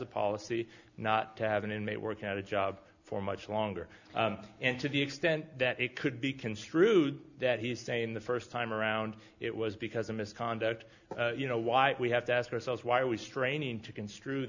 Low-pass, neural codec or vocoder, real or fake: 7.2 kHz; none; real